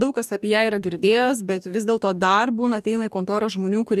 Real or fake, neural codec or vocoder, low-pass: fake; codec, 44.1 kHz, 2.6 kbps, DAC; 14.4 kHz